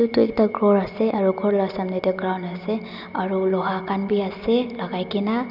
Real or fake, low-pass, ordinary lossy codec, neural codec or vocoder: real; 5.4 kHz; none; none